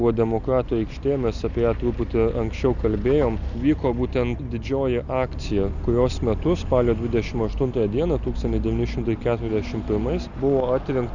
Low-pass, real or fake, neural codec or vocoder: 7.2 kHz; real; none